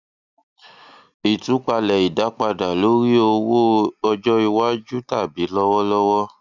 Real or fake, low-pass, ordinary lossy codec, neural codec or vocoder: real; 7.2 kHz; none; none